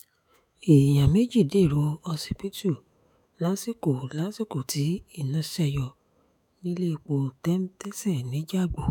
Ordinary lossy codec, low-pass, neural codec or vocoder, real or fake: none; 19.8 kHz; autoencoder, 48 kHz, 128 numbers a frame, DAC-VAE, trained on Japanese speech; fake